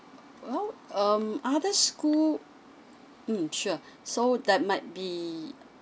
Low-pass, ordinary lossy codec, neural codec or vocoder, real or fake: none; none; none; real